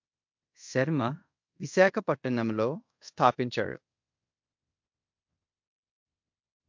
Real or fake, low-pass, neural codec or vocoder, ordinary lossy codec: fake; 7.2 kHz; codec, 24 kHz, 0.5 kbps, DualCodec; MP3, 48 kbps